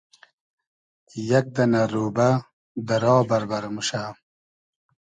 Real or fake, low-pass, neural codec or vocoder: real; 9.9 kHz; none